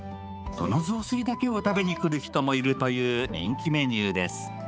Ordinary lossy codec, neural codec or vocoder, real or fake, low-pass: none; codec, 16 kHz, 4 kbps, X-Codec, HuBERT features, trained on balanced general audio; fake; none